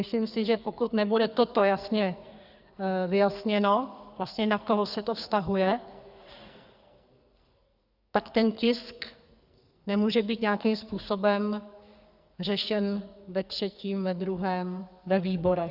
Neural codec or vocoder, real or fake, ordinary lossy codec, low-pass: codec, 44.1 kHz, 2.6 kbps, SNAC; fake; Opus, 64 kbps; 5.4 kHz